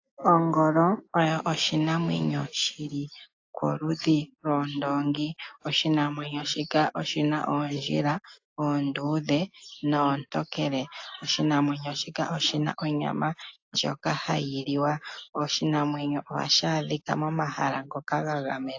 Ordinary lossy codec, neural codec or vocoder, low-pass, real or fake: AAC, 48 kbps; none; 7.2 kHz; real